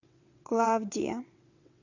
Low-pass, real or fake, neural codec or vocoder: 7.2 kHz; fake; vocoder, 22.05 kHz, 80 mel bands, WaveNeXt